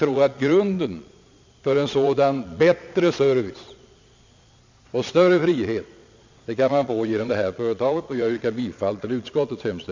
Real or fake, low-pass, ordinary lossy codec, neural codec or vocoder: fake; 7.2 kHz; MP3, 64 kbps; vocoder, 22.05 kHz, 80 mel bands, Vocos